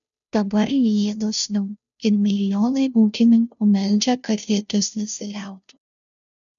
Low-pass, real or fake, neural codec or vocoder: 7.2 kHz; fake; codec, 16 kHz, 0.5 kbps, FunCodec, trained on Chinese and English, 25 frames a second